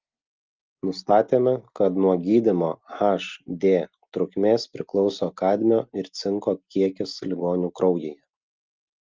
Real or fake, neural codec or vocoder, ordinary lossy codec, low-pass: real; none; Opus, 24 kbps; 7.2 kHz